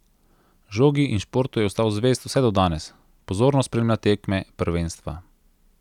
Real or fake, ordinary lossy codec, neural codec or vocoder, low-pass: real; none; none; 19.8 kHz